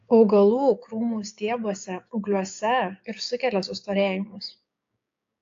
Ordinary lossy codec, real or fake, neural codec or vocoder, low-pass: AAC, 48 kbps; fake; codec, 16 kHz, 6 kbps, DAC; 7.2 kHz